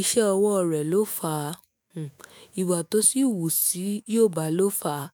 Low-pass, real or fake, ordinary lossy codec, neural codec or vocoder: none; fake; none; autoencoder, 48 kHz, 128 numbers a frame, DAC-VAE, trained on Japanese speech